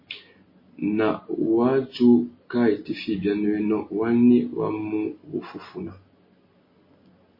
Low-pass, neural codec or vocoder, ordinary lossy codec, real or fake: 5.4 kHz; none; MP3, 24 kbps; real